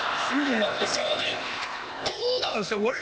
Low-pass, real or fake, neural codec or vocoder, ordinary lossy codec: none; fake; codec, 16 kHz, 0.8 kbps, ZipCodec; none